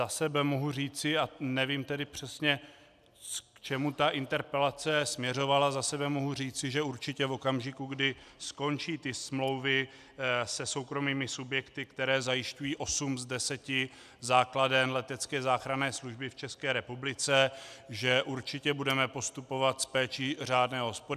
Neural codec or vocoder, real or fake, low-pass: none; real; 14.4 kHz